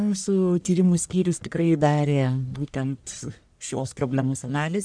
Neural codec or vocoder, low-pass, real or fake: codec, 44.1 kHz, 1.7 kbps, Pupu-Codec; 9.9 kHz; fake